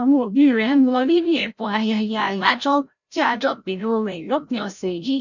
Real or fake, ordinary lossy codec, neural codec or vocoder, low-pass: fake; none; codec, 16 kHz, 0.5 kbps, FreqCodec, larger model; 7.2 kHz